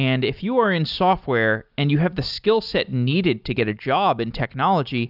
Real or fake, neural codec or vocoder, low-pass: real; none; 5.4 kHz